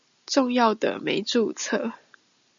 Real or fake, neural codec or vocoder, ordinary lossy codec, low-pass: real; none; MP3, 64 kbps; 7.2 kHz